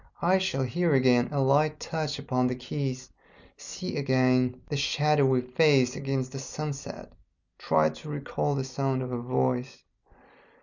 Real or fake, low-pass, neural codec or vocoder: real; 7.2 kHz; none